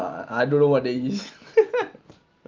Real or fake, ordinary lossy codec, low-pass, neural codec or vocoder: real; Opus, 24 kbps; 7.2 kHz; none